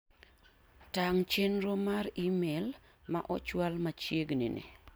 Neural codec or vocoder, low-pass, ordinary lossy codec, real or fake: none; none; none; real